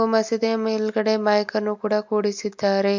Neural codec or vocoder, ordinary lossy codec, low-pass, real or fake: none; MP3, 48 kbps; 7.2 kHz; real